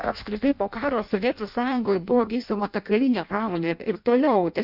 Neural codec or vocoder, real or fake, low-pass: codec, 16 kHz in and 24 kHz out, 0.6 kbps, FireRedTTS-2 codec; fake; 5.4 kHz